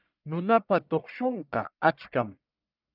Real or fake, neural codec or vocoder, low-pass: fake; codec, 44.1 kHz, 1.7 kbps, Pupu-Codec; 5.4 kHz